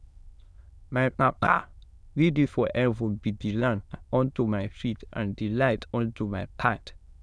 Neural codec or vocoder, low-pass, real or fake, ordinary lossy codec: autoencoder, 22.05 kHz, a latent of 192 numbers a frame, VITS, trained on many speakers; none; fake; none